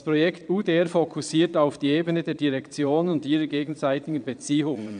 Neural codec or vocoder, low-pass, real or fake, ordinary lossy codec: vocoder, 22.05 kHz, 80 mel bands, Vocos; 9.9 kHz; fake; none